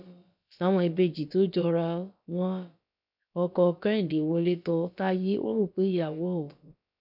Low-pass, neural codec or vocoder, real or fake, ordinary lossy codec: 5.4 kHz; codec, 16 kHz, about 1 kbps, DyCAST, with the encoder's durations; fake; none